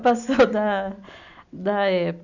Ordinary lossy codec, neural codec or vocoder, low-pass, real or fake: none; none; 7.2 kHz; real